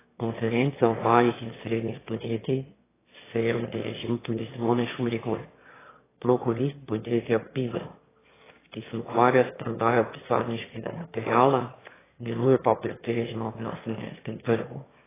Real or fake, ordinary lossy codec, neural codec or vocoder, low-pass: fake; AAC, 16 kbps; autoencoder, 22.05 kHz, a latent of 192 numbers a frame, VITS, trained on one speaker; 3.6 kHz